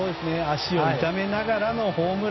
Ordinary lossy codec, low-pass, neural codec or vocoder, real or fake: MP3, 24 kbps; 7.2 kHz; none; real